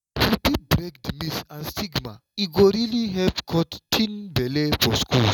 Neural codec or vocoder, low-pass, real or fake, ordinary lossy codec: none; 19.8 kHz; real; none